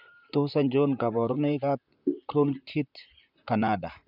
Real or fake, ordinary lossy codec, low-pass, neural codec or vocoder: fake; none; 5.4 kHz; vocoder, 44.1 kHz, 128 mel bands, Pupu-Vocoder